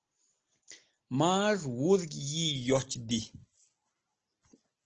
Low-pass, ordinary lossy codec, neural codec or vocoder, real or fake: 7.2 kHz; Opus, 16 kbps; none; real